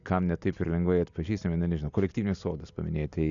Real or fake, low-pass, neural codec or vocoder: real; 7.2 kHz; none